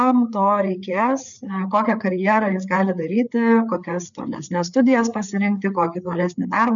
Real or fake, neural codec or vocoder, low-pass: fake; codec, 16 kHz, 4 kbps, FreqCodec, larger model; 7.2 kHz